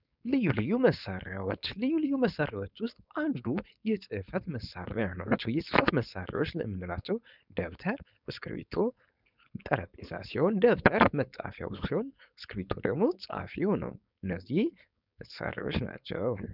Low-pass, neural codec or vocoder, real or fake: 5.4 kHz; codec, 16 kHz, 4.8 kbps, FACodec; fake